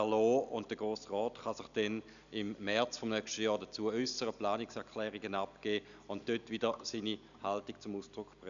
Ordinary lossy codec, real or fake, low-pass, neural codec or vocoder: none; real; 7.2 kHz; none